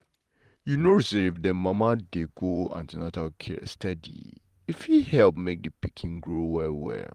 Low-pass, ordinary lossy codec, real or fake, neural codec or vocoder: 14.4 kHz; Opus, 24 kbps; fake; vocoder, 44.1 kHz, 128 mel bands, Pupu-Vocoder